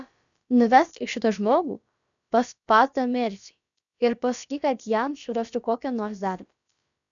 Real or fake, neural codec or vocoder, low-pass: fake; codec, 16 kHz, about 1 kbps, DyCAST, with the encoder's durations; 7.2 kHz